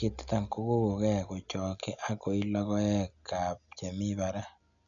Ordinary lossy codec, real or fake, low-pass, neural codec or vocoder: none; real; 7.2 kHz; none